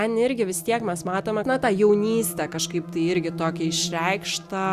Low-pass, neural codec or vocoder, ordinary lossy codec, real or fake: 14.4 kHz; none; Opus, 64 kbps; real